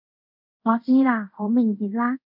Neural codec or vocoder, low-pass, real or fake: codec, 24 kHz, 0.5 kbps, DualCodec; 5.4 kHz; fake